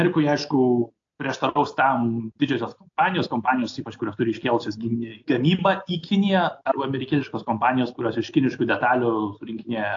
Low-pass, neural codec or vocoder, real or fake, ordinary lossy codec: 7.2 kHz; none; real; AAC, 48 kbps